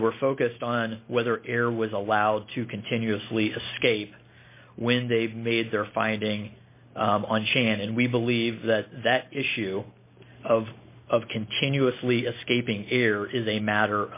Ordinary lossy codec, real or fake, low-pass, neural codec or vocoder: MP3, 24 kbps; real; 3.6 kHz; none